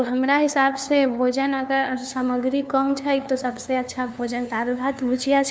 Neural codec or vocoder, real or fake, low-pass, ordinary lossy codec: codec, 16 kHz, 2 kbps, FunCodec, trained on LibriTTS, 25 frames a second; fake; none; none